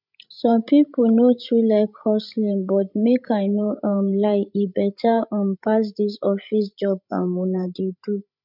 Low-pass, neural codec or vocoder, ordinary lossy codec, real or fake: 5.4 kHz; codec, 16 kHz, 16 kbps, FreqCodec, larger model; none; fake